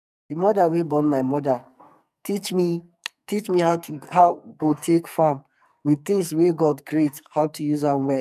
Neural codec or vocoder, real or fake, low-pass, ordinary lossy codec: codec, 44.1 kHz, 2.6 kbps, SNAC; fake; 14.4 kHz; none